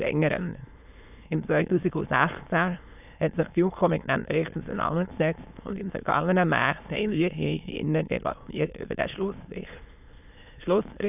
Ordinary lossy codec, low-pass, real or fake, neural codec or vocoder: none; 3.6 kHz; fake; autoencoder, 22.05 kHz, a latent of 192 numbers a frame, VITS, trained on many speakers